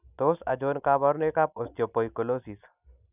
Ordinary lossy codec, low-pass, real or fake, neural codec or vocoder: none; 3.6 kHz; real; none